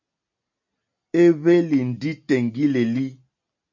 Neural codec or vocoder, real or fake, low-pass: none; real; 7.2 kHz